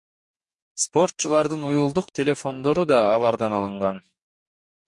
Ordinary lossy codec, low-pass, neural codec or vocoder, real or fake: MP3, 64 kbps; 10.8 kHz; codec, 44.1 kHz, 2.6 kbps, DAC; fake